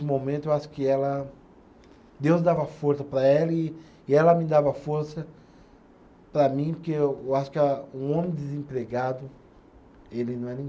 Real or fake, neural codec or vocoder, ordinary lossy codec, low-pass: real; none; none; none